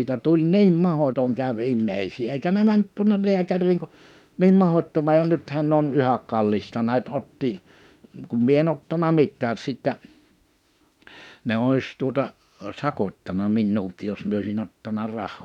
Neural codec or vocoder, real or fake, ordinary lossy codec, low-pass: autoencoder, 48 kHz, 32 numbers a frame, DAC-VAE, trained on Japanese speech; fake; none; 19.8 kHz